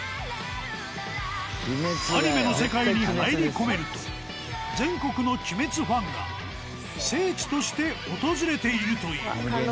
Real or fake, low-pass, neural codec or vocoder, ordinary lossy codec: real; none; none; none